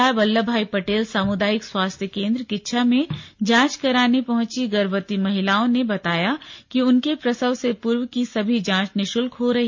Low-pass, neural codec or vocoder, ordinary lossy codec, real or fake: 7.2 kHz; none; MP3, 48 kbps; real